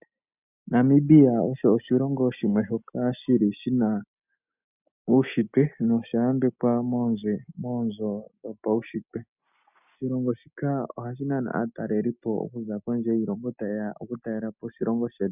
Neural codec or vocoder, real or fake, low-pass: none; real; 3.6 kHz